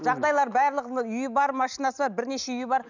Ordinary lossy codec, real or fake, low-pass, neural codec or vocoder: none; real; 7.2 kHz; none